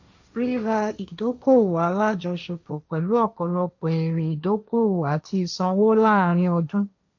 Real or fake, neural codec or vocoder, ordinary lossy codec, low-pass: fake; codec, 16 kHz, 1.1 kbps, Voila-Tokenizer; none; 7.2 kHz